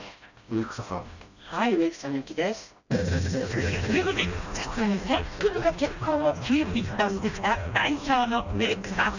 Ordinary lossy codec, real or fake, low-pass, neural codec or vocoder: none; fake; 7.2 kHz; codec, 16 kHz, 1 kbps, FreqCodec, smaller model